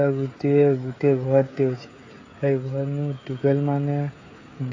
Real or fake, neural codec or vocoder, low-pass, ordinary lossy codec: fake; codec, 16 kHz, 16 kbps, FreqCodec, smaller model; 7.2 kHz; AAC, 32 kbps